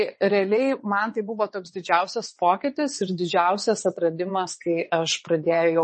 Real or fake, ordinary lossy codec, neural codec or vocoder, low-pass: fake; MP3, 32 kbps; vocoder, 22.05 kHz, 80 mel bands, WaveNeXt; 9.9 kHz